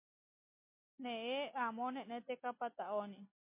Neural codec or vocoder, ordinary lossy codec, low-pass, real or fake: none; MP3, 24 kbps; 3.6 kHz; real